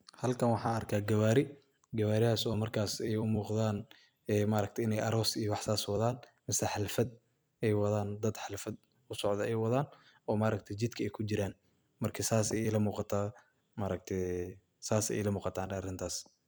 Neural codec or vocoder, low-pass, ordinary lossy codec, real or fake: vocoder, 44.1 kHz, 128 mel bands every 256 samples, BigVGAN v2; none; none; fake